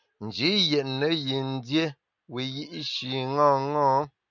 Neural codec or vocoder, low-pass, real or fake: none; 7.2 kHz; real